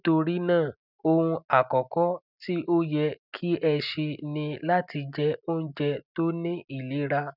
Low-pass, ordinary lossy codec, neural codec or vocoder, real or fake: 5.4 kHz; none; none; real